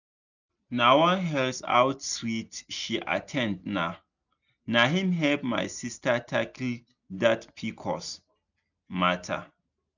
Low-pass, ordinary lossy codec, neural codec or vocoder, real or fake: 7.2 kHz; none; none; real